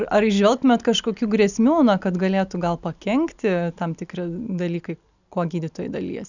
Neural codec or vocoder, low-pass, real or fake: none; 7.2 kHz; real